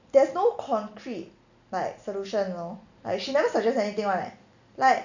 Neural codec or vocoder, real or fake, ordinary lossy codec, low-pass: none; real; none; 7.2 kHz